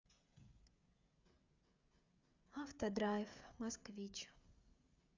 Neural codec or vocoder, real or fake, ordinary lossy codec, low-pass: codec, 16 kHz, 16 kbps, FreqCodec, smaller model; fake; Opus, 64 kbps; 7.2 kHz